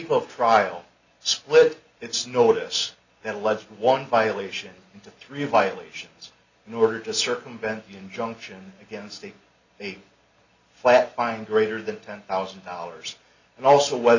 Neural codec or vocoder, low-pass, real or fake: none; 7.2 kHz; real